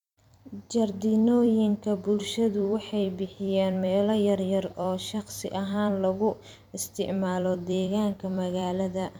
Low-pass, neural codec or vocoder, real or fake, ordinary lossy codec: 19.8 kHz; vocoder, 44.1 kHz, 128 mel bands every 256 samples, BigVGAN v2; fake; none